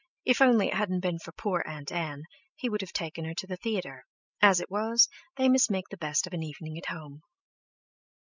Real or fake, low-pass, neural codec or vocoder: real; 7.2 kHz; none